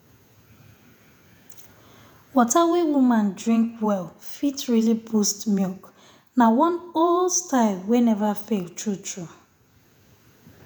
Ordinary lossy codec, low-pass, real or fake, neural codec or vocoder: none; none; fake; vocoder, 48 kHz, 128 mel bands, Vocos